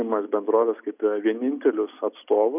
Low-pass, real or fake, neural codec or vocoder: 3.6 kHz; real; none